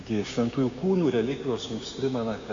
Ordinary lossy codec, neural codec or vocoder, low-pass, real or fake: MP3, 64 kbps; codec, 16 kHz, 6 kbps, DAC; 7.2 kHz; fake